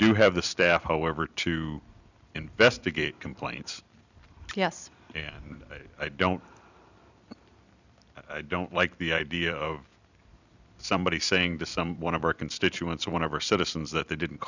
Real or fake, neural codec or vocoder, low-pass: fake; vocoder, 44.1 kHz, 128 mel bands every 256 samples, BigVGAN v2; 7.2 kHz